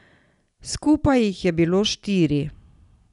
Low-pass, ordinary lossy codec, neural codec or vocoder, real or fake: 10.8 kHz; none; none; real